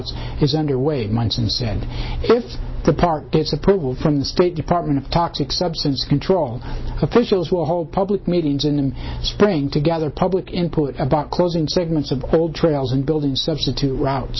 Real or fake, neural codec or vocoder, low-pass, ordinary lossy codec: real; none; 7.2 kHz; MP3, 24 kbps